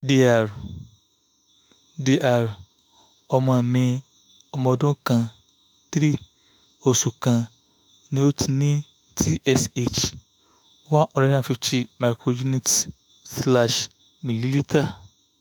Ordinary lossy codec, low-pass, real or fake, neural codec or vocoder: none; none; fake; autoencoder, 48 kHz, 32 numbers a frame, DAC-VAE, trained on Japanese speech